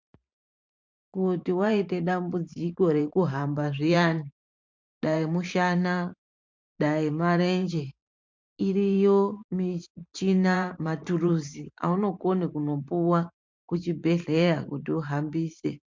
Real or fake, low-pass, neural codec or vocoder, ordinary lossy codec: fake; 7.2 kHz; vocoder, 44.1 kHz, 128 mel bands every 512 samples, BigVGAN v2; MP3, 64 kbps